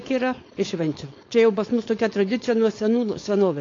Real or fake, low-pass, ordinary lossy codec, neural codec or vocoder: fake; 7.2 kHz; AAC, 32 kbps; codec, 16 kHz, 4.8 kbps, FACodec